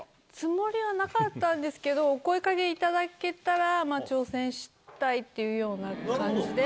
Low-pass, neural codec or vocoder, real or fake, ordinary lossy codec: none; none; real; none